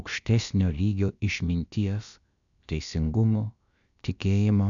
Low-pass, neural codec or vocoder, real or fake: 7.2 kHz; codec, 16 kHz, about 1 kbps, DyCAST, with the encoder's durations; fake